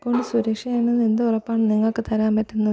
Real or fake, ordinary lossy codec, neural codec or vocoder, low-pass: real; none; none; none